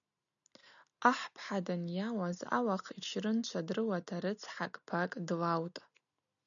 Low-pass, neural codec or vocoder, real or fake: 7.2 kHz; none; real